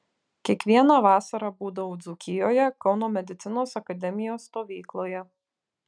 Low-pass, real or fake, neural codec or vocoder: 9.9 kHz; real; none